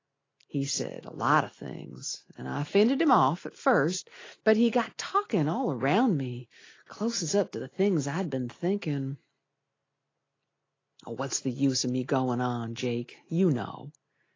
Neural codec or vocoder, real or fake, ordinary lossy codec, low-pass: none; real; AAC, 32 kbps; 7.2 kHz